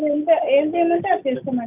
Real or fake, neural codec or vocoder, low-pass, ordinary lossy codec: real; none; 3.6 kHz; none